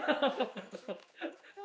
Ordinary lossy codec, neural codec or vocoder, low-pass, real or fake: none; codec, 16 kHz, 2 kbps, X-Codec, HuBERT features, trained on general audio; none; fake